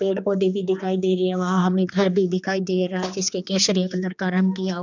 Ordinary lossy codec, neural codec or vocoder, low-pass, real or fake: none; codec, 16 kHz, 2 kbps, X-Codec, HuBERT features, trained on general audio; 7.2 kHz; fake